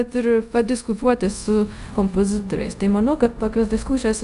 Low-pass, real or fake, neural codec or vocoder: 10.8 kHz; fake; codec, 24 kHz, 0.5 kbps, DualCodec